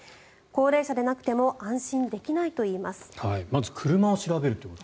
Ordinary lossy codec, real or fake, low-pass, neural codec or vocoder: none; real; none; none